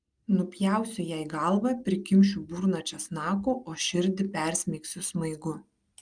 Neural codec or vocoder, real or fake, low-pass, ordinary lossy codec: none; real; 9.9 kHz; Opus, 32 kbps